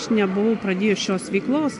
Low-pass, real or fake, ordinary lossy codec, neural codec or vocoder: 10.8 kHz; real; AAC, 64 kbps; none